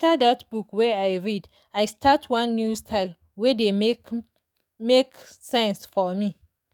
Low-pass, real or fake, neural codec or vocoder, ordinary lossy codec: 19.8 kHz; fake; codec, 44.1 kHz, 7.8 kbps, DAC; none